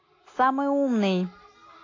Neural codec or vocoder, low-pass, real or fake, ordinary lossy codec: none; 7.2 kHz; real; AAC, 32 kbps